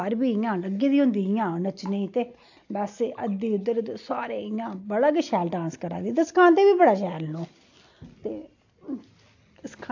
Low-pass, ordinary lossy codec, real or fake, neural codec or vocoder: 7.2 kHz; AAC, 48 kbps; real; none